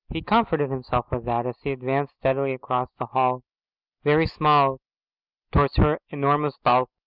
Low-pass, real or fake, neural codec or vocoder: 5.4 kHz; real; none